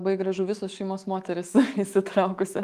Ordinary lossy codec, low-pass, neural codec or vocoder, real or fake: Opus, 24 kbps; 14.4 kHz; autoencoder, 48 kHz, 128 numbers a frame, DAC-VAE, trained on Japanese speech; fake